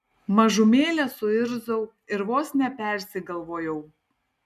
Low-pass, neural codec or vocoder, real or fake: 14.4 kHz; none; real